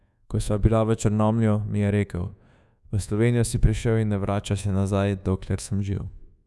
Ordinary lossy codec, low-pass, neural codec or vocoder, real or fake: none; none; codec, 24 kHz, 1.2 kbps, DualCodec; fake